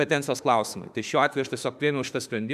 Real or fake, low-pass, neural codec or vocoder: fake; 14.4 kHz; autoencoder, 48 kHz, 32 numbers a frame, DAC-VAE, trained on Japanese speech